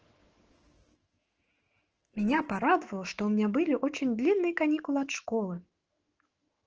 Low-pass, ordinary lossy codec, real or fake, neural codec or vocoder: 7.2 kHz; Opus, 16 kbps; fake; autoencoder, 48 kHz, 128 numbers a frame, DAC-VAE, trained on Japanese speech